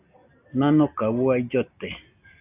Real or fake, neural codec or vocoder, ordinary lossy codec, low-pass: real; none; AAC, 32 kbps; 3.6 kHz